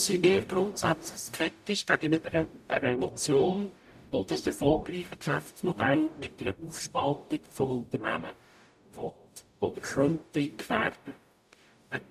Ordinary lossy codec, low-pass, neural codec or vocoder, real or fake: none; 14.4 kHz; codec, 44.1 kHz, 0.9 kbps, DAC; fake